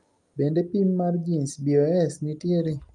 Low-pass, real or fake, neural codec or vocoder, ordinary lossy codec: 10.8 kHz; real; none; Opus, 32 kbps